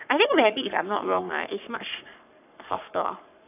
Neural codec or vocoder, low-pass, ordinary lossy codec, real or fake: codec, 44.1 kHz, 3.4 kbps, Pupu-Codec; 3.6 kHz; none; fake